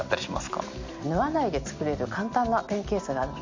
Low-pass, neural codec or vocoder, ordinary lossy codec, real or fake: 7.2 kHz; none; MP3, 64 kbps; real